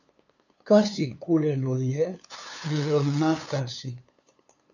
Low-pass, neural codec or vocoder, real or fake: 7.2 kHz; codec, 16 kHz, 2 kbps, FunCodec, trained on LibriTTS, 25 frames a second; fake